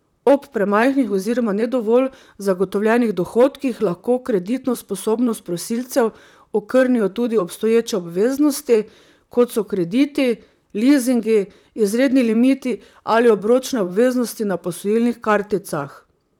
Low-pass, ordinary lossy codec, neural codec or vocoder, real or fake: 19.8 kHz; none; vocoder, 44.1 kHz, 128 mel bands, Pupu-Vocoder; fake